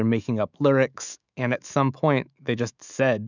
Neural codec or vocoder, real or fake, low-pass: autoencoder, 48 kHz, 128 numbers a frame, DAC-VAE, trained on Japanese speech; fake; 7.2 kHz